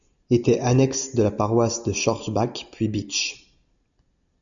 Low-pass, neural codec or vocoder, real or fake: 7.2 kHz; none; real